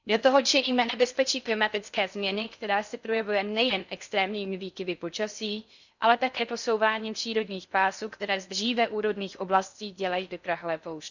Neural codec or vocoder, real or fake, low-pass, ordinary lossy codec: codec, 16 kHz in and 24 kHz out, 0.6 kbps, FocalCodec, streaming, 4096 codes; fake; 7.2 kHz; none